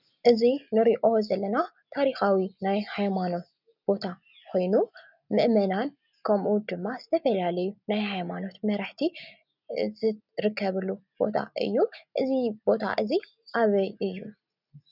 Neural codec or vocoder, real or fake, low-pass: none; real; 5.4 kHz